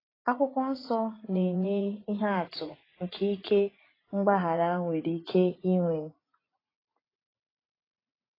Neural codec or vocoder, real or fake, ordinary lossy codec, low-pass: vocoder, 44.1 kHz, 80 mel bands, Vocos; fake; AAC, 24 kbps; 5.4 kHz